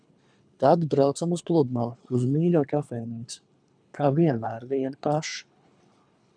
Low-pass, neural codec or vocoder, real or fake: 9.9 kHz; codec, 24 kHz, 3 kbps, HILCodec; fake